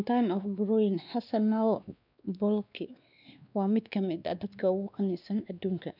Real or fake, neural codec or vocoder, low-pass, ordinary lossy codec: fake; codec, 16 kHz, 2 kbps, X-Codec, WavLM features, trained on Multilingual LibriSpeech; 5.4 kHz; none